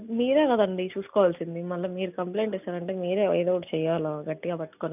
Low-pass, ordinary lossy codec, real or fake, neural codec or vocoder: 3.6 kHz; none; real; none